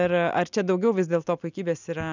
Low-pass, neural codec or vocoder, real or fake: 7.2 kHz; none; real